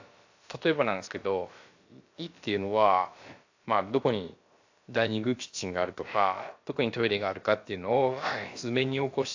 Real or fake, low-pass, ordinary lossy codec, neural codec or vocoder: fake; 7.2 kHz; AAC, 48 kbps; codec, 16 kHz, about 1 kbps, DyCAST, with the encoder's durations